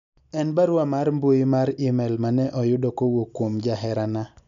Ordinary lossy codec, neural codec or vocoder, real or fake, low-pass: none; none; real; 7.2 kHz